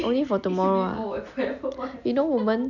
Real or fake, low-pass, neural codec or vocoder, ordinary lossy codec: real; 7.2 kHz; none; none